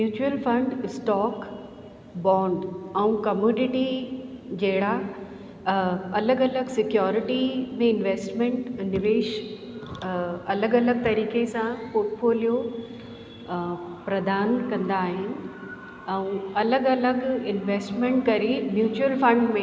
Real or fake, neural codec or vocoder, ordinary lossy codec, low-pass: real; none; none; none